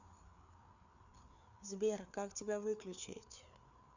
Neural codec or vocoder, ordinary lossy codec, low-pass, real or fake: codec, 16 kHz, 4 kbps, FreqCodec, larger model; none; 7.2 kHz; fake